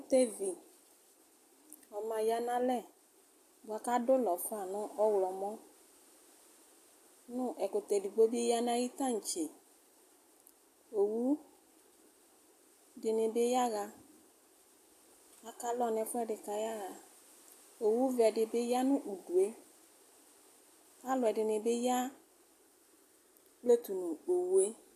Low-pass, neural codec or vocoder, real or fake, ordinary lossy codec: 14.4 kHz; none; real; AAC, 64 kbps